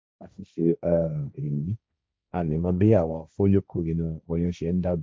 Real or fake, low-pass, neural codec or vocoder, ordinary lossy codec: fake; none; codec, 16 kHz, 1.1 kbps, Voila-Tokenizer; none